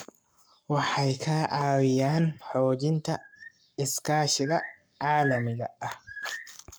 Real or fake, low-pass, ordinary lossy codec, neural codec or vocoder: fake; none; none; codec, 44.1 kHz, 7.8 kbps, Pupu-Codec